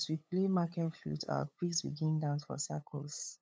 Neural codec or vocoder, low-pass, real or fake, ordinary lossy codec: codec, 16 kHz, 8 kbps, FunCodec, trained on LibriTTS, 25 frames a second; none; fake; none